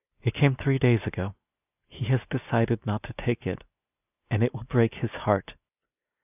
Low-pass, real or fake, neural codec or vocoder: 3.6 kHz; real; none